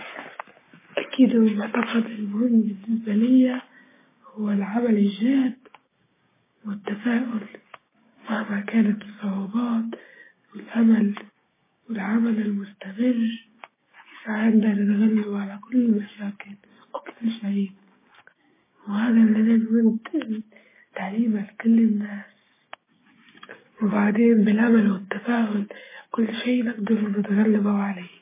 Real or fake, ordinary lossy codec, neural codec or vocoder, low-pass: fake; MP3, 16 kbps; codec, 16 kHz, 6 kbps, DAC; 3.6 kHz